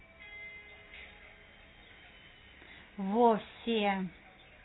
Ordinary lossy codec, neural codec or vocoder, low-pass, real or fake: AAC, 16 kbps; none; 7.2 kHz; real